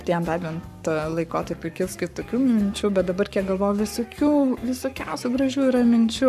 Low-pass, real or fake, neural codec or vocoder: 14.4 kHz; fake; codec, 44.1 kHz, 7.8 kbps, Pupu-Codec